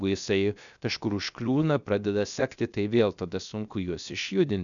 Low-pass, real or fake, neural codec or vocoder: 7.2 kHz; fake; codec, 16 kHz, 0.7 kbps, FocalCodec